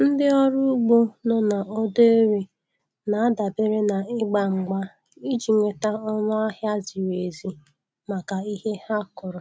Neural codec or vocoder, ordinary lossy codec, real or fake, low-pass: none; none; real; none